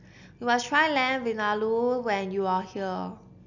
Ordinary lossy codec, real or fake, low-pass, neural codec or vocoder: none; real; 7.2 kHz; none